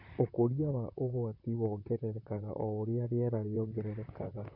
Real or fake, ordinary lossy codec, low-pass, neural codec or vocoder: fake; none; 5.4 kHz; vocoder, 44.1 kHz, 128 mel bands, Pupu-Vocoder